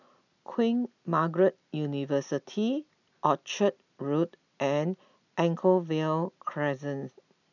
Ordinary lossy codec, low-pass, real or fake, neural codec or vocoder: none; 7.2 kHz; real; none